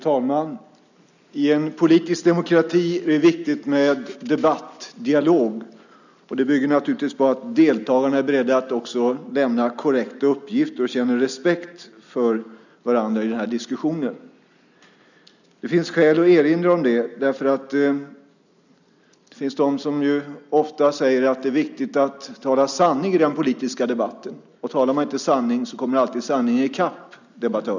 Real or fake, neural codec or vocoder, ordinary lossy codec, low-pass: real; none; none; 7.2 kHz